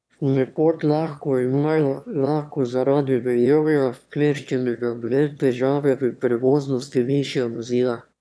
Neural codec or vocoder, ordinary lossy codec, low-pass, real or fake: autoencoder, 22.05 kHz, a latent of 192 numbers a frame, VITS, trained on one speaker; none; none; fake